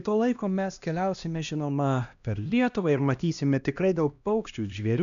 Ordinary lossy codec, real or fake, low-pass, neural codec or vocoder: AAC, 96 kbps; fake; 7.2 kHz; codec, 16 kHz, 1 kbps, X-Codec, HuBERT features, trained on LibriSpeech